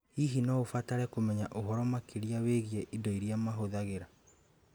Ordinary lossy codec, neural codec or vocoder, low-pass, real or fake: none; none; none; real